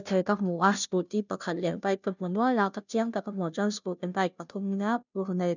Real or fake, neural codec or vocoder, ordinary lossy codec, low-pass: fake; codec, 16 kHz, 0.5 kbps, FunCodec, trained on Chinese and English, 25 frames a second; none; 7.2 kHz